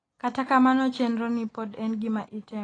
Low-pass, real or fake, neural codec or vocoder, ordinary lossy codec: 9.9 kHz; real; none; AAC, 32 kbps